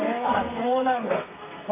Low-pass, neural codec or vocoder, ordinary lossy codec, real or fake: 3.6 kHz; codec, 32 kHz, 1.9 kbps, SNAC; none; fake